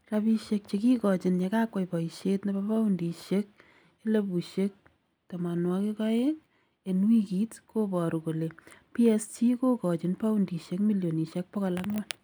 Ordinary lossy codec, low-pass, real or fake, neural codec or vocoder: none; none; real; none